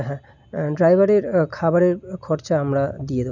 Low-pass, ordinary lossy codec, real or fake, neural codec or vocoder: 7.2 kHz; none; real; none